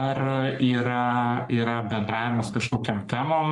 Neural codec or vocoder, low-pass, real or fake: codec, 44.1 kHz, 3.4 kbps, Pupu-Codec; 10.8 kHz; fake